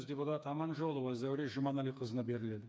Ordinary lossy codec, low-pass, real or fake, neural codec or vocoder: none; none; fake; codec, 16 kHz, 4 kbps, FreqCodec, smaller model